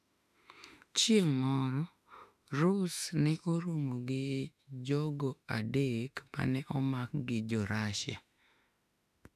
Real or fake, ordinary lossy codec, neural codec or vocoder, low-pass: fake; none; autoencoder, 48 kHz, 32 numbers a frame, DAC-VAE, trained on Japanese speech; 14.4 kHz